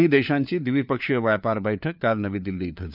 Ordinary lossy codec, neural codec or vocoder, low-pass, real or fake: none; codec, 16 kHz, 4 kbps, FunCodec, trained on LibriTTS, 50 frames a second; 5.4 kHz; fake